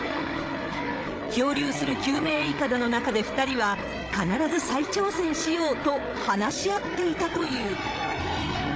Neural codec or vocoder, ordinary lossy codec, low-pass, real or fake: codec, 16 kHz, 8 kbps, FreqCodec, larger model; none; none; fake